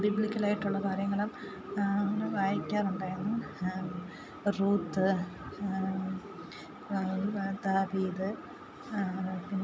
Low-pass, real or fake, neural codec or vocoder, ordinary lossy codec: none; real; none; none